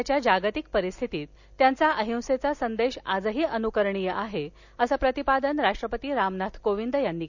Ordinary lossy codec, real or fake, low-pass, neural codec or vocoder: none; real; 7.2 kHz; none